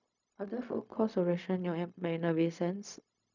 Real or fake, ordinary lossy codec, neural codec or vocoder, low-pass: fake; none; codec, 16 kHz, 0.4 kbps, LongCat-Audio-Codec; 7.2 kHz